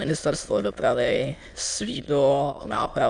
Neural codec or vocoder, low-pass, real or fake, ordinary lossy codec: autoencoder, 22.05 kHz, a latent of 192 numbers a frame, VITS, trained on many speakers; 9.9 kHz; fake; MP3, 64 kbps